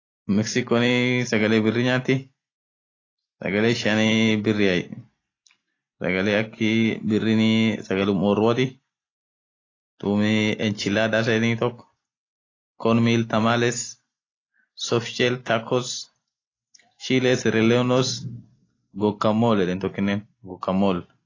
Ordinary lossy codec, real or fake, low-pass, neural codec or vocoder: AAC, 32 kbps; real; 7.2 kHz; none